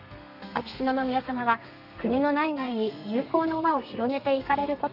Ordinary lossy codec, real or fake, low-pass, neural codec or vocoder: none; fake; 5.4 kHz; codec, 32 kHz, 1.9 kbps, SNAC